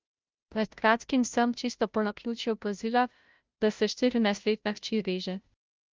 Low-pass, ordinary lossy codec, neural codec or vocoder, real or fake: 7.2 kHz; Opus, 32 kbps; codec, 16 kHz, 0.5 kbps, FunCodec, trained on Chinese and English, 25 frames a second; fake